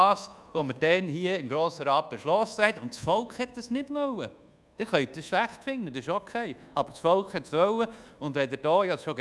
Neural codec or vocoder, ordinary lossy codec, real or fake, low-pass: codec, 24 kHz, 1.2 kbps, DualCodec; none; fake; none